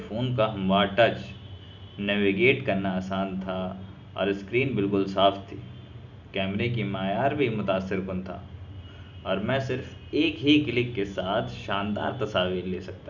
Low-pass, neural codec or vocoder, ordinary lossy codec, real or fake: 7.2 kHz; none; none; real